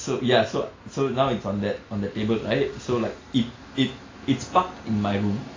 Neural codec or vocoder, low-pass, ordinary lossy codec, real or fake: none; 7.2 kHz; AAC, 32 kbps; real